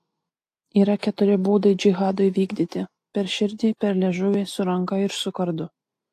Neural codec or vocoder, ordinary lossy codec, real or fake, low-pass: none; AAC, 64 kbps; real; 14.4 kHz